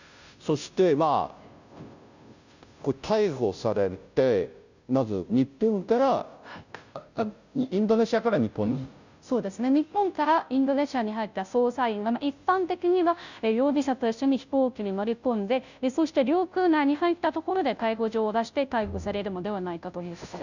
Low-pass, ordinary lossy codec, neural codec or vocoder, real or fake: 7.2 kHz; none; codec, 16 kHz, 0.5 kbps, FunCodec, trained on Chinese and English, 25 frames a second; fake